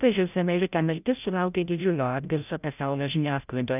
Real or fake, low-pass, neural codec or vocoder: fake; 3.6 kHz; codec, 16 kHz, 0.5 kbps, FreqCodec, larger model